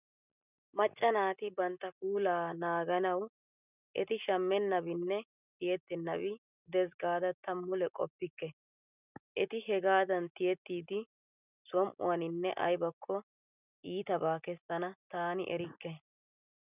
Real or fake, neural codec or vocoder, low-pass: real; none; 3.6 kHz